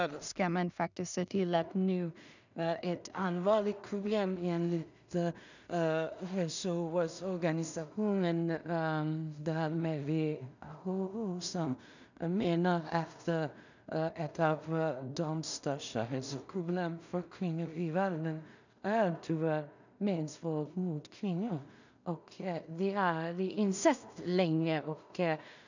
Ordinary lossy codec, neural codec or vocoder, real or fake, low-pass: none; codec, 16 kHz in and 24 kHz out, 0.4 kbps, LongCat-Audio-Codec, two codebook decoder; fake; 7.2 kHz